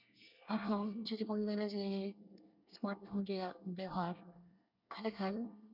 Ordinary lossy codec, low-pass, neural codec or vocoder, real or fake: none; 5.4 kHz; codec, 24 kHz, 1 kbps, SNAC; fake